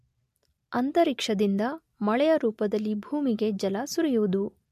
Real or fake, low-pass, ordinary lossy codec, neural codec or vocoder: real; 14.4 kHz; MP3, 64 kbps; none